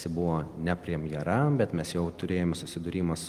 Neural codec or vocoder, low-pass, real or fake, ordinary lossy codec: none; 14.4 kHz; real; Opus, 24 kbps